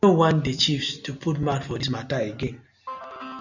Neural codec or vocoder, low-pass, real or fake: none; 7.2 kHz; real